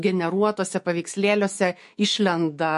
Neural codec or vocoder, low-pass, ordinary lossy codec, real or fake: autoencoder, 48 kHz, 128 numbers a frame, DAC-VAE, trained on Japanese speech; 14.4 kHz; MP3, 48 kbps; fake